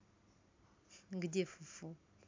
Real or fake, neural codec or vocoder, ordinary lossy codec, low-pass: real; none; MP3, 64 kbps; 7.2 kHz